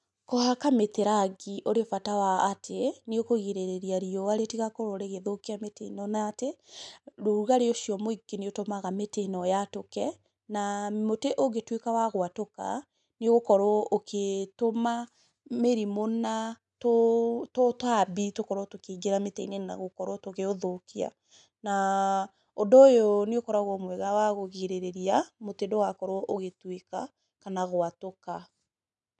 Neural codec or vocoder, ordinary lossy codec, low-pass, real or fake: none; none; 10.8 kHz; real